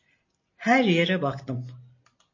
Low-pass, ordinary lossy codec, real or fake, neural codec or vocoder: 7.2 kHz; MP3, 32 kbps; real; none